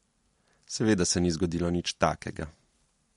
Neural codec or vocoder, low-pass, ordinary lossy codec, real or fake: none; 19.8 kHz; MP3, 48 kbps; real